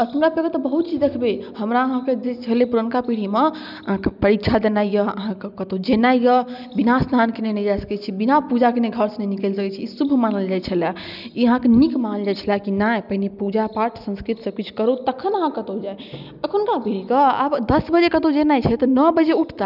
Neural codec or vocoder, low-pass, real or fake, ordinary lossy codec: none; 5.4 kHz; real; none